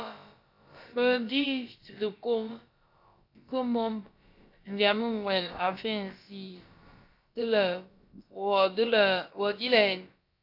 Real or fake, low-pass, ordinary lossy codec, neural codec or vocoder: fake; 5.4 kHz; AAC, 48 kbps; codec, 16 kHz, about 1 kbps, DyCAST, with the encoder's durations